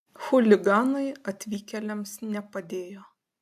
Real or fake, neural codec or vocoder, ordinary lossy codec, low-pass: real; none; AAC, 96 kbps; 14.4 kHz